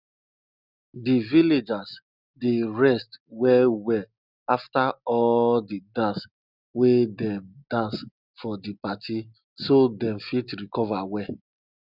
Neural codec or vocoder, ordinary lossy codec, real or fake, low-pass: none; none; real; 5.4 kHz